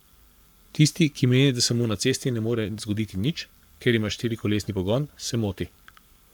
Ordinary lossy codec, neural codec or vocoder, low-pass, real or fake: none; codec, 44.1 kHz, 7.8 kbps, Pupu-Codec; 19.8 kHz; fake